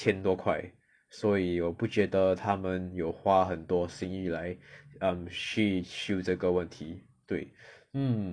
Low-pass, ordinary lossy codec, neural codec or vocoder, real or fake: 9.9 kHz; none; none; real